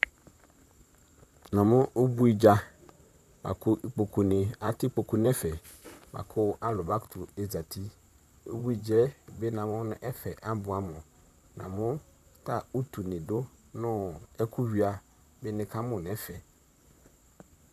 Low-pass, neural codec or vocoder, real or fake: 14.4 kHz; vocoder, 44.1 kHz, 128 mel bands, Pupu-Vocoder; fake